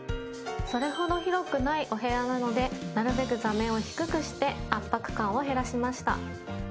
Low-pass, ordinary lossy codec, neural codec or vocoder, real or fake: none; none; none; real